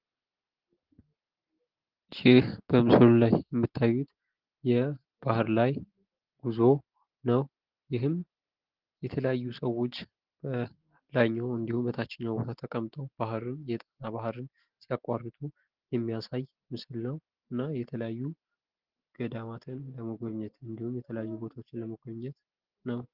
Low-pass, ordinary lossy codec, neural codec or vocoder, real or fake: 5.4 kHz; Opus, 16 kbps; none; real